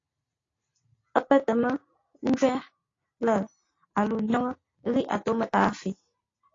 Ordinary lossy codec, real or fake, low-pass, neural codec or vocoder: AAC, 32 kbps; real; 7.2 kHz; none